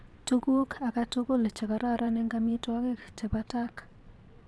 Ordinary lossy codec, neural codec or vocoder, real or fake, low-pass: none; vocoder, 22.05 kHz, 80 mel bands, Vocos; fake; none